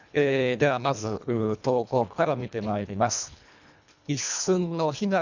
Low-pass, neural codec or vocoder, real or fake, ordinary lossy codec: 7.2 kHz; codec, 24 kHz, 1.5 kbps, HILCodec; fake; none